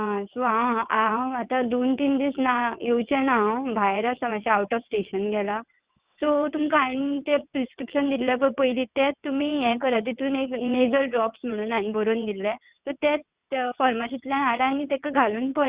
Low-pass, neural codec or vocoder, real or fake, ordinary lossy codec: 3.6 kHz; vocoder, 22.05 kHz, 80 mel bands, WaveNeXt; fake; Opus, 64 kbps